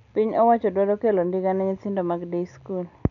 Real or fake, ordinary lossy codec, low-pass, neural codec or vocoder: real; none; 7.2 kHz; none